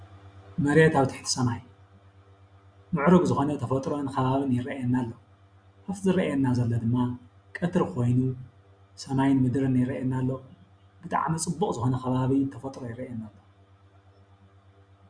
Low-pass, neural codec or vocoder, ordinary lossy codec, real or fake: 9.9 kHz; none; Opus, 64 kbps; real